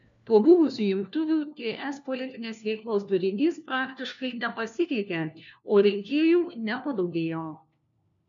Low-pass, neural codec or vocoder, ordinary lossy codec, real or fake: 7.2 kHz; codec, 16 kHz, 1 kbps, FunCodec, trained on LibriTTS, 50 frames a second; MP3, 64 kbps; fake